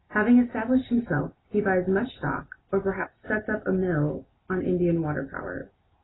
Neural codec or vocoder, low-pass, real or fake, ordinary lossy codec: none; 7.2 kHz; real; AAC, 16 kbps